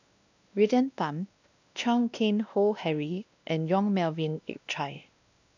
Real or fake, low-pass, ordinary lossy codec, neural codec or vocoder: fake; 7.2 kHz; none; codec, 16 kHz, 1 kbps, X-Codec, WavLM features, trained on Multilingual LibriSpeech